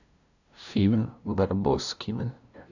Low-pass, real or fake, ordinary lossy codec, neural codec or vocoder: 7.2 kHz; fake; none; codec, 16 kHz, 0.5 kbps, FunCodec, trained on LibriTTS, 25 frames a second